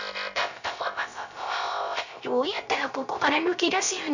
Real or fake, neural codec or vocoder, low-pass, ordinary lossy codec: fake; codec, 16 kHz, 0.3 kbps, FocalCodec; 7.2 kHz; none